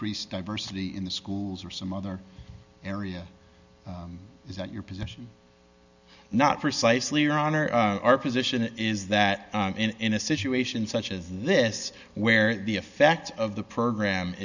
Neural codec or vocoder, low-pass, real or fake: none; 7.2 kHz; real